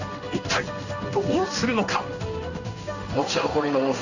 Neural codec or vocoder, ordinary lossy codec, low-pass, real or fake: codec, 16 kHz in and 24 kHz out, 1 kbps, XY-Tokenizer; none; 7.2 kHz; fake